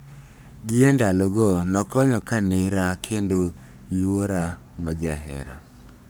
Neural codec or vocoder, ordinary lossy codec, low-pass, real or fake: codec, 44.1 kHz, 3.4 kbps, Pupu-Codec; none; none; fake